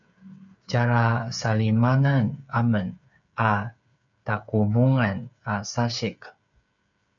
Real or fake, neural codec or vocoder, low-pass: fake; codec, 16 kHz, 8 kbps, FreqCodec, smaller model; 7.2 kHz